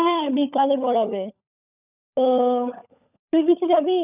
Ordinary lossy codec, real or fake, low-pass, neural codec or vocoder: none; fake; 3.6 kHz; codec, 16 kHz, 16 kbps, FunCodec, trained on LibriTTS, 50 frames a second